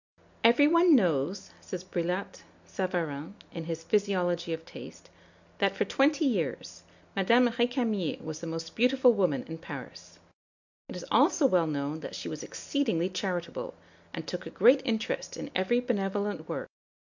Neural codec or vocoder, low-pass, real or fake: none; 7.2 kHz; real